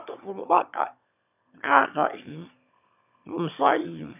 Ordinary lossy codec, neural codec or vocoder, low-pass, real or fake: none; autoencoder, 22.05 kHz, a latent of 192 numbers a frame, VITS, trained on one speaker; 3.6 kHz; fake